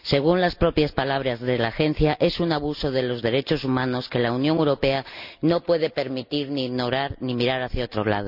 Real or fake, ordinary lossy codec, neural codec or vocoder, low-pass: real; none; none; 5.4 kHz